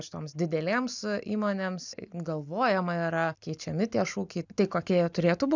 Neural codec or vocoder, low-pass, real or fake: none; 7.2 kHz; real